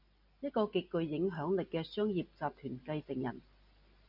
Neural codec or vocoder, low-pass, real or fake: none; 5.4 kHz; real